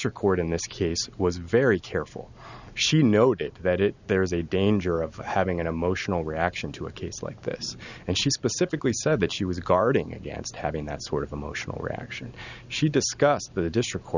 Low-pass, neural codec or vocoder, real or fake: 7.2 kHz; none; real